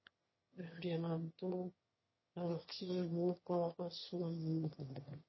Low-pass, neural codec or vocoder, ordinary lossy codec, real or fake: 7.2 kHz; autoencoder, 22.05 kHz, a latent of 192 numbers a frame, VITS, trained on one speaker; MP3, 24 kbps; fake